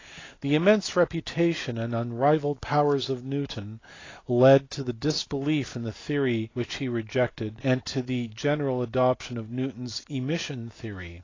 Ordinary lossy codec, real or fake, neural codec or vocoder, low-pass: AAC, 32 kbps; real; none; 7.2 kHz